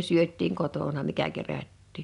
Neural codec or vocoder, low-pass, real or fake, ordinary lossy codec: none; 10.8 kHz; real; none